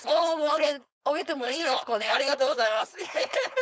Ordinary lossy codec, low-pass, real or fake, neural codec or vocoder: none; none; fake; codec, 16 kHz, 4.8 kbps, FACodec